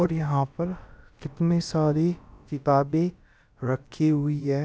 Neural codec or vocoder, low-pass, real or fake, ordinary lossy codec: codec, 16 kHz, about 1 kbps, DyCAST, with the encoder's durations; none; fake; none